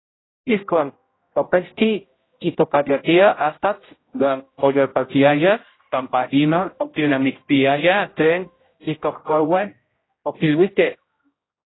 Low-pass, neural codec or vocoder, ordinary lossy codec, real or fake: 7.2 kHz; codec, 16 kHz, 0.5 kbps, X-Codec, HuBERT features, trained on general audio; AAC, 16 kbps; fake